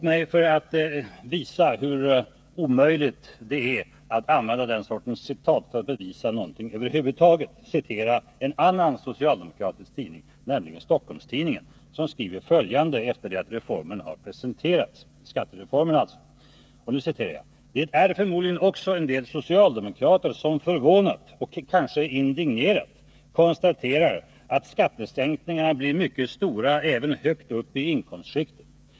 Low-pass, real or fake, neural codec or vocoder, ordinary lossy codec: none; fake; codec, 16 kHz, 8 kbps, FreqCodec, smaller model; none